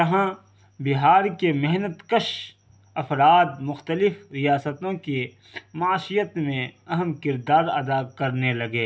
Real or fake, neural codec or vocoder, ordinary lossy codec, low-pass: real; none; none; none